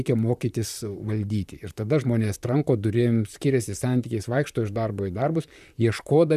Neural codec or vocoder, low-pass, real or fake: vocoder, 44.1 kHz, 128 mel bands, Pupu-Vocoder; 14.4 kHz; fake